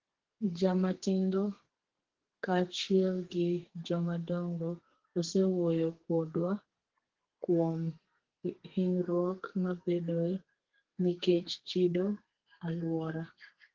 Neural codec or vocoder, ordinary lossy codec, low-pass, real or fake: codec, 32 kHz, 1.9 kbps, SNAC; Opus, 16 kbps; 7.2 kHz; fake